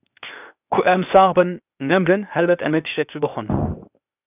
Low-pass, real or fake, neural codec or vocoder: 3.6 kHz; fake; codec, 16 kHz, 0.8 kbps, ZipCodec